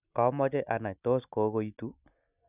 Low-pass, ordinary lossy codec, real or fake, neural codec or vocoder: 3.6 kHz; none; real; none